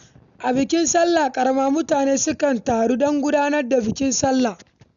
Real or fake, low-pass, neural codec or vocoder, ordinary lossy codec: real; 7.2 kHz; none; none